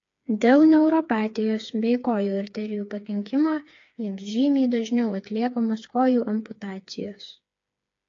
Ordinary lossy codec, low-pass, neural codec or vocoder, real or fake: AAC, 48 kbps; 7.2 kHz; codec, 16 kHz, 4 kbps, FreqCodec, smaller model; fake